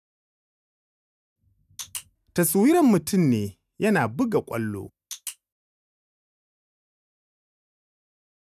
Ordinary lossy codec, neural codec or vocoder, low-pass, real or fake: none; none; 14.4 kHz; real